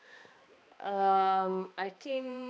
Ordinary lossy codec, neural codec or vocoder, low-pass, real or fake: none; codec, 16 kHz, 2 kbps, X-Codec, HuBERT features, trained on balanced general audio; none; fake